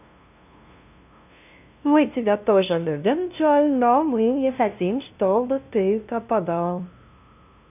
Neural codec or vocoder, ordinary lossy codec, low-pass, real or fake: codec, 16 kHz, 0.5 kbps, FunCodec, trained on LibriTTS, 25 frames a second; none; 3.6 kHz; fake